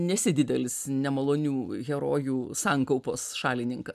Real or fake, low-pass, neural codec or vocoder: real; 14.4 kHz; none